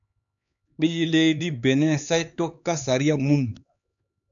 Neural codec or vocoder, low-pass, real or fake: codec, 16 kHz, 4 kbps, X-Codec, HuBERT features, trained on LibriSpeech; 7.2 kHz; fake